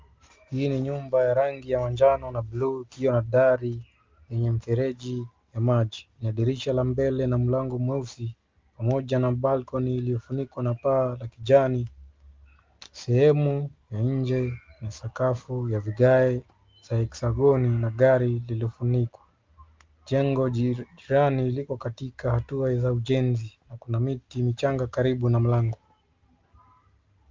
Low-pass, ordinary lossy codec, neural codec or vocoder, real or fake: 7.2 kHz; Opus, 32 kbps; none; real